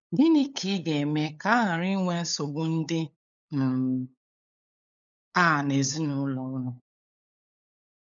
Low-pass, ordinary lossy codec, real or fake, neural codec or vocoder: 7.2 kHz; none; fake; codec, 16 kHz, 8 kbps, FunCodec, trained on LibriTTS, 25 frames a second